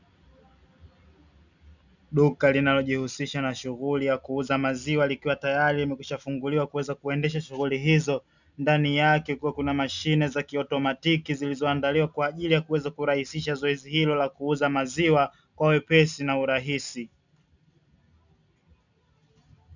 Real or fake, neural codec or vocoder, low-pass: real; none; 7.2 kHz